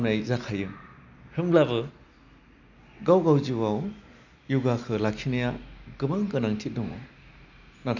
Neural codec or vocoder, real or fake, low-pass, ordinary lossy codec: none; real; 7.2 kHz; none